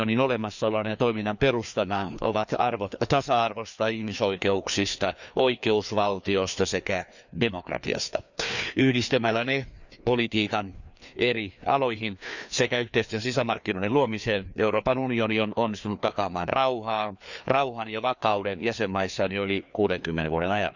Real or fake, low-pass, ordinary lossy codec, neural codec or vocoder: fake; 7.2 kHz; none; codec, 16 kHz, 2 kbps, FreqCodec, larger model